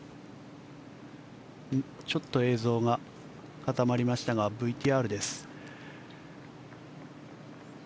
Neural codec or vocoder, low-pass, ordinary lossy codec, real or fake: none; none; none; real